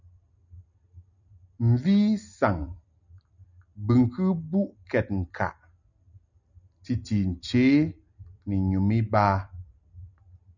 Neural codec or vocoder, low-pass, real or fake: none; 7.2 kHz; real